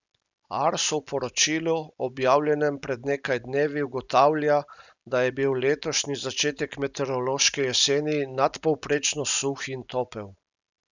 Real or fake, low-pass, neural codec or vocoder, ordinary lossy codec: real; 7.2 kHz; none; none